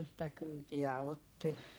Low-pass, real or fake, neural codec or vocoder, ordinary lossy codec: none; fake; codec, 44.1 kHz, 1.7 kbps, Pupu-Codec; none